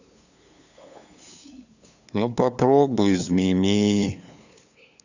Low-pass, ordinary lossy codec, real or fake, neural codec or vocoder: 7.2 kHz; none; fake; codec, 16 kHz, 2 kbps, FunCodec, trained on LibriTTS, 25 frames a second